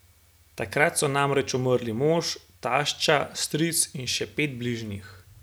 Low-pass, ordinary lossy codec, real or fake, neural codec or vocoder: none; none; real; none